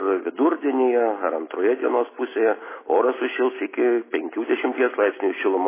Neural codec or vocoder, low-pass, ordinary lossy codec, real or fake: none; 3.6 kHz; MP3, 16 kbps; real